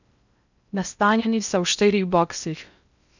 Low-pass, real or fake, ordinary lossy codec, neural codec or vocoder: 7.2 kHz; fake; none; codec, 16 kHz in and 24 kHz out, 0.6 kbps, FocalCodec, streaming, 4096 codes